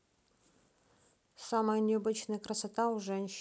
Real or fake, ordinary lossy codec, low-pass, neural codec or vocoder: real; none; none; none